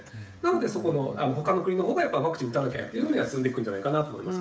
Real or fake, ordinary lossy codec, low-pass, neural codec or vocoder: fake; none; none; codec, 16 kHz, 16 kbps, FreqCodec, smaller model